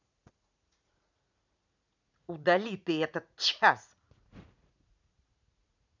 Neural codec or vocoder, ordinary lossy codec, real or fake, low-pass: vocoder, 44.1 kHz, 80 mel bands, Vocos; none; fake; 7.2 kHz